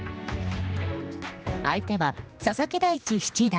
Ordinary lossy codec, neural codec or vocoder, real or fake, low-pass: none; codec, 16 kHz, 2 kbps, X-Codec, HuBERT features, trained on general audio; fake; none